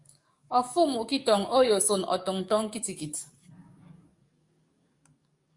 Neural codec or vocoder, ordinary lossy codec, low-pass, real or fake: codec, 44.1 kHz, 7.8 kbps, DAC; Opus, 64 kbps; 10.8 kHz; fake